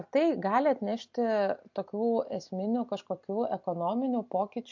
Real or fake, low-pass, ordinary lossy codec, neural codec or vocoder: real; 7.2 kHz; MP3, 48 kbps; none